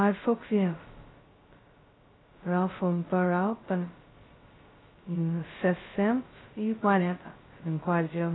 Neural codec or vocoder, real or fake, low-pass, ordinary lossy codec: codec, 16 kHz, 0.2 kbps, FocalCodec; fake; 7.2 kHz; AAC, 16 kbps